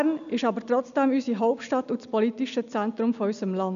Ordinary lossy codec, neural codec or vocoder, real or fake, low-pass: none; none; real; 7.2 kHz